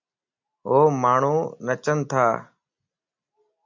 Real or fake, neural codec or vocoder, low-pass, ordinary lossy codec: real; none; 7.2 kHz; MP3, 64 kbps